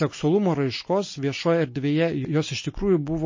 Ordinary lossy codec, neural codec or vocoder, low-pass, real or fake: MP3, 32 kbps; none; 7.2 kHz; real